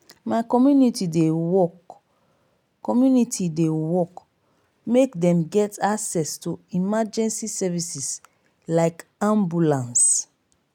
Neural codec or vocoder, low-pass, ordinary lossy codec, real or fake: none; 19.8 kHz; none; real